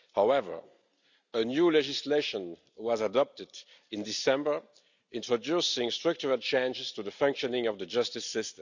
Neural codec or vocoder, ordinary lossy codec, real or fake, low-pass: none; none; real; 7.2 kHz